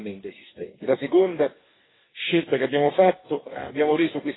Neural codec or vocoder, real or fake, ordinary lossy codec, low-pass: codec, 44.1 kHz, 2.6 kbps, DAC; fake; AAC, 16 kbps; 7.2 kHz